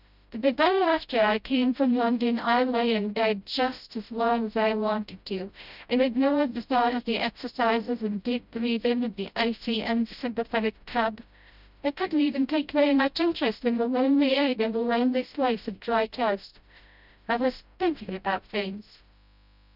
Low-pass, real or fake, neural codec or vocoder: 5.4 kHz; fake; codec, 16 kHz, 0.5 kbps, FreqCodec, smaller model